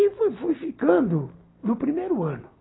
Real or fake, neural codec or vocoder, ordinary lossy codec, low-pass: real; none; AAC, 16 kbps; 7.2 kHz